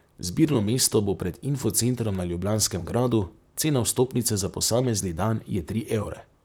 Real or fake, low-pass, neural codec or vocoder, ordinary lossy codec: fake; none; vocoder, 44.1 kHz, 128 mel bands, Pupu-Vocoder; none